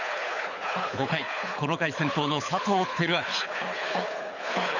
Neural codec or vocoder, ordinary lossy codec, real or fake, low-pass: codec, 24 kHz, 6 kbps, HILCodec; none; fake; 7.2 kHz